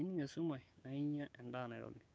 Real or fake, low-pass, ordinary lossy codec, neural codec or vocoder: fake; none; none; codec, 16 kHz, 6 kbps, DAC